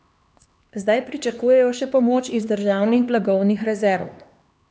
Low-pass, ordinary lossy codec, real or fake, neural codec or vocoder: none; none; fake; codec, 16 kHz, 2 kbps, X-Codec, HuBERT features, trained on LibriSpeech